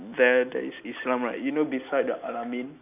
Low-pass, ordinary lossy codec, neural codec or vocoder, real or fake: 3.6 kHz; none; none; real